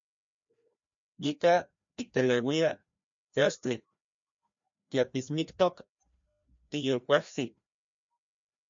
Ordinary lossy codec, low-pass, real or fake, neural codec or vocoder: MP3, 48 kbps; 7.2 kHz; fake; codec, 16 kHz, 1 kbps, FreqCodec, larger model